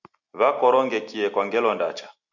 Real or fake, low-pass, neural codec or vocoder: real; 7.2 kHz; none